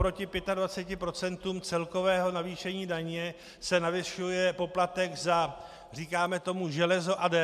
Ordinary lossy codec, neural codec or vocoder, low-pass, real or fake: MP3, 96 kbps; none; 14.4 kHz; real